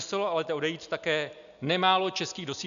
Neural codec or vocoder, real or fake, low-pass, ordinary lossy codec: none; real; 7.2 kHz; MP3, 96 kbps